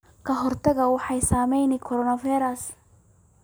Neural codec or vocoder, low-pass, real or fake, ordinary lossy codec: none; none; real; none